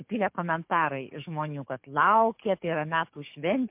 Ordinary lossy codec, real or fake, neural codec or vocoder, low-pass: MP3, 32 kbps; fake; codec, 44.1 kHz, 7.8 kbps, DAC; 3.6 kHz